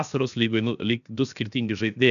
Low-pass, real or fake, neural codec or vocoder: 7.2 kHz; fake; codec, 16 kHz, 0.7 kbps, FocalCodec